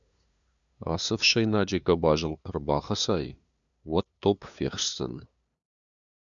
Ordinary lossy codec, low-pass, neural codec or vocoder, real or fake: MP3, 96 kbps; 7.2 kHz; codec, 16 kHz, 2 kbps, FunCodec, trained on LibriTTS, 25 frames a second; fake